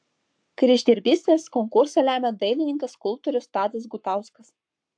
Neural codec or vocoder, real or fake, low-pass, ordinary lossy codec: codec, 44.1 kHz, 7.8 kbps, Pupu-Codec; fake; 9.9 kHz; AAC, 64 kbps